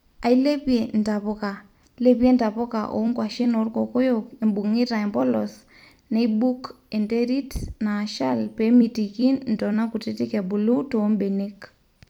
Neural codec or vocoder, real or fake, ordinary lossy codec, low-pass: vocoder, 44.1 kHz, 128 mel bands every 256 samples, BigVGAN v2; fake; none; 19.8 kHz